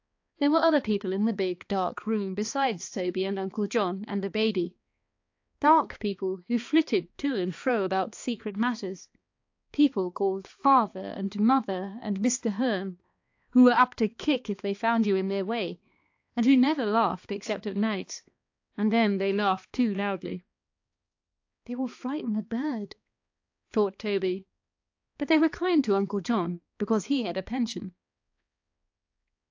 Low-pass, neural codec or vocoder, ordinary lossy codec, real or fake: 7.2 kHz; codec, 16 kHz, 2 kbps, X-Codec, HuBERT features, trained on balanced general audio; AAC, 48 kbps; fake